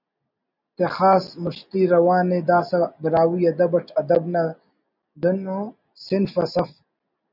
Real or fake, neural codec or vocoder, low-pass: real; none; 5.4 kHz